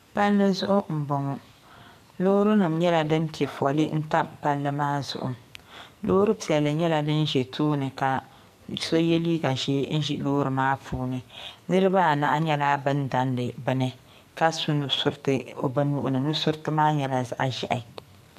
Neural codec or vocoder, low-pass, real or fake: codec, 44.1 kHz, 2.6 kbps, SNAC; 14.4 kHz; fake